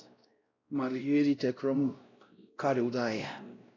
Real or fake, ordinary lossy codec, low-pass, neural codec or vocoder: fake; AAC, 32 kbps; 7.2 kHz; codec, 16 kHz, 0.5 kbps, X-Codec, WavLM features, trained on Multilingual LibriSpeech